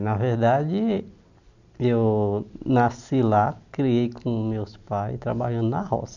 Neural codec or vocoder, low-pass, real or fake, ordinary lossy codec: none; 7.2 kHz; real; none